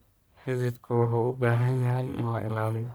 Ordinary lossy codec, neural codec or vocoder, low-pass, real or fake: none; codec, 44.1 kHz, 1.7 kbps, Pupu-Codec; none; fake